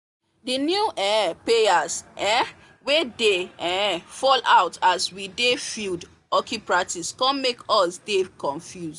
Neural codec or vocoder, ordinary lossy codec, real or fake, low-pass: none; none; real; 10.8 kHz